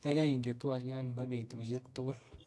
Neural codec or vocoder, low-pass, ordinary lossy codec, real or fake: codec, 24 kHz, 0.9 kbps, WavTokenizer, medium music audio release; none; none; fake